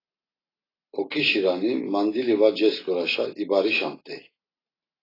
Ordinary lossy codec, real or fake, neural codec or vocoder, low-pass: AAC, 24 kbps; real; none; 5.4 kHz